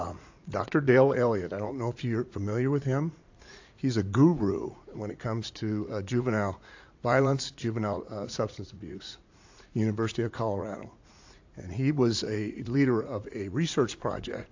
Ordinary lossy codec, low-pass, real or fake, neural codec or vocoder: AAC, 48 kbps; 7.2 kHz; fake; vocoder, 44.1 kHz, 80 mel bands, Vocos